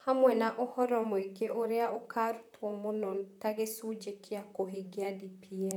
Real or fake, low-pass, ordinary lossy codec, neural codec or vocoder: fake; 19.8 kHz; none; vocoder, 44.1 kHz, 128 mel bands, Pupu-Vocoder